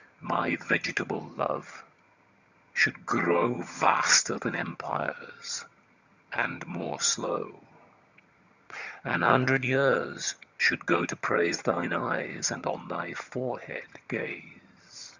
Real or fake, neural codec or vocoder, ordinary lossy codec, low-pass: fake; vocoder, 22.05 kHz, 80 mel bands, HiFi-GAN; Opus, 64 kbps; 7.2 kHz